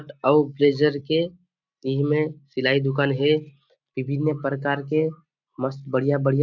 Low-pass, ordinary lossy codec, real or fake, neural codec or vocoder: 7.2 kHz; none; real; none